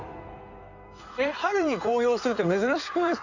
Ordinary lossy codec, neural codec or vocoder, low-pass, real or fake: none; codec, 16 kHz in and 24 kHz out, 2.2 kbps, FireRedTTS-2 codec; 7.2 kHz; fake